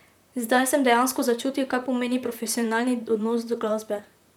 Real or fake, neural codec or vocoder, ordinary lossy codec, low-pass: fake; vocoder, 44.1 kHz, 128 mel bands, Pupu-Vocoder; none; 19.8 kHz